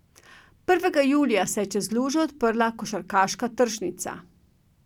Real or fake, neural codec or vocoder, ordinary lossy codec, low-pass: real; none; none; 19.8 kHz